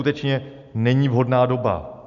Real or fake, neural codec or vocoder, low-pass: real; none; 7.2 kHz